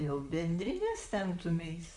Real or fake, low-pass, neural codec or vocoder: fake; 10.8 kHz; vocoder, 44.1 kHz, 128 mel bands, Pupu-Vocoder